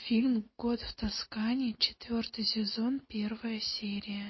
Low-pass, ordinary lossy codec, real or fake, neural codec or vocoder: 7.2 kHz; MP3, 24 kbps; real; none